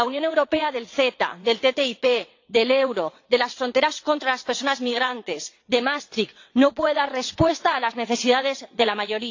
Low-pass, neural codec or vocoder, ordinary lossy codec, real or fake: 7.2 kHz; vocoder, 22.05 kHz, 80 mel bands, WaveNeXt; AAC, 48 kbps; fake